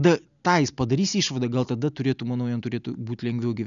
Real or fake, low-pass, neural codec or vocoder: real; 7.2 kHz; none